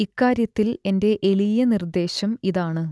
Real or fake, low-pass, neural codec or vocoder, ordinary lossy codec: real; none; none; none